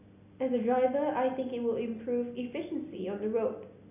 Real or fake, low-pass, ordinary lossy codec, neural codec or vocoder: real; 3.6 kHz; none; none